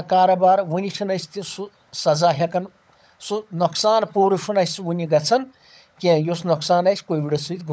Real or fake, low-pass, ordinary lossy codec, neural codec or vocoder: fake; none; none; codec, 16 kHz, 16 kbps, FunCodec, trained on LibriTTS, 50 frames a second